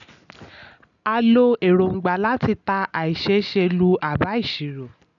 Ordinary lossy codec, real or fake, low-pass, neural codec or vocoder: none; real; 7.2 kHz; none